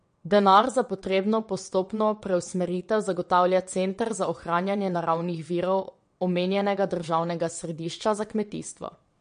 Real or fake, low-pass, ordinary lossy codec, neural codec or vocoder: fake; 9.9 kHz; MP3, 48 kbps; vocoder, 22.05 kHz, 80 mel bands, WaveNeXt